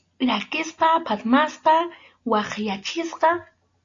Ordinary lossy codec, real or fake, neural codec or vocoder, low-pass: AAC, 48 kbps; real; none; 7.2 kHz